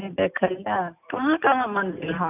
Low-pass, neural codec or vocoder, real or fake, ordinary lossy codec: 3.6 kHz; none; real; none